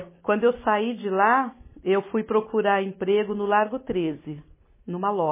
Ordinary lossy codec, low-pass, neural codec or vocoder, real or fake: MP3, 16 kbps; 3.6 kHz; none; real